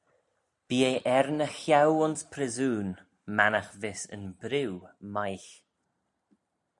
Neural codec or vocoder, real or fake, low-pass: none; real; 10.8 kHz